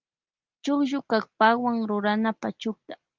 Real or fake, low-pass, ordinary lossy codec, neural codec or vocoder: real; 7.2 kHz; Opus, 32 kbps; none